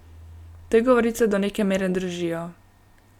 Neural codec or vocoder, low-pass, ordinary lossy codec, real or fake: none; 19.8 kHz; none; real